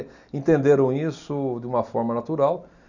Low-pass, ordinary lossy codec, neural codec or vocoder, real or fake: 7.2 kHz; none; none; real